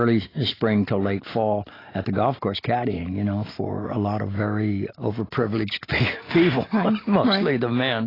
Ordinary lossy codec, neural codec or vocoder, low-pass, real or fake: AAC, 24 kbps; none; 5.4 kHz; real